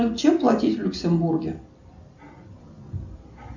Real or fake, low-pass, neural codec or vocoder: real; 7.2 kHz; none